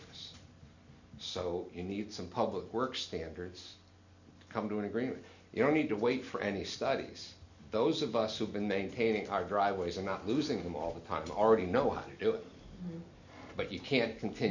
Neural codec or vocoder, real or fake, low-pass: none; real; 7.2 kHz